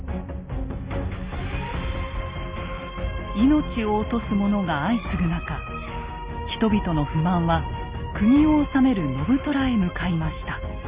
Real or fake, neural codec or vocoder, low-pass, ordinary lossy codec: real; none; 3.6 kHz; Opus, 32 kbps